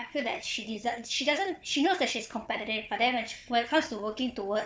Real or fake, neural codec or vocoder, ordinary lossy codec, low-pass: fake; codec, 16 kHz, 4 kbps, FunCodec, trained on Chinese and English, 50 frames a second; none; none